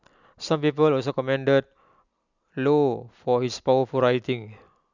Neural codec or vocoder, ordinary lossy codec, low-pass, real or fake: none; none; 7.2 kHz; real